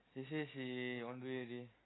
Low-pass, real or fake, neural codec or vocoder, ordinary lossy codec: 7.2 kHz; real; none; AAC, 16 kbps